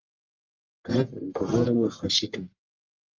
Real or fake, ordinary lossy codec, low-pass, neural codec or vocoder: fake; Opus, 24 kbps; 7.2 kHz; codec, 44.1 kHz, 1.7 kbps, Pupu-Codec